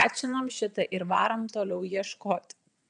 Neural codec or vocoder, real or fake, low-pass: vocoder, 22.05 kHz, 80 mel bands, WaveNeXt; fake; 9.9 kHz